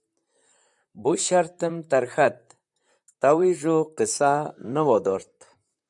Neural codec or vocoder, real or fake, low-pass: vocoder, 44.1 kHz, 128 mel bands, Pupu-Vocoder; fake; 10.8 kHz